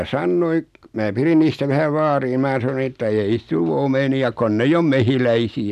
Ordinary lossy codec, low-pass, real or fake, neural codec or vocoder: none; 14.4 kHz; real; none